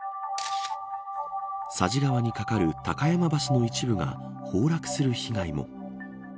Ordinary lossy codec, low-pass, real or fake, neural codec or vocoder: none; none; real; none